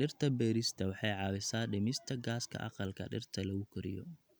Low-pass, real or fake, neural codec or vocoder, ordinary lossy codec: none; real; none; none